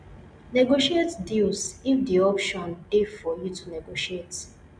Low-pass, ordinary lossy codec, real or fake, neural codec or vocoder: 9.9 kHz; none; real; none